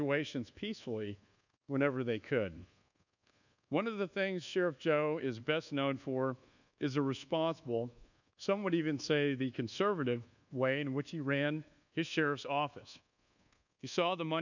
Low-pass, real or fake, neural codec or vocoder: 7.2 kHz; fake; codec, 24 kHz, 1.2 kbps, DualCodec